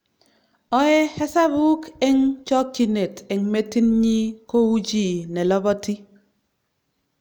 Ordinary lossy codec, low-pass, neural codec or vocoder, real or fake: none; none; none; real